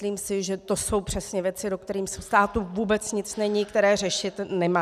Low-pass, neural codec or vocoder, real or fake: 14.4 kHz; none; real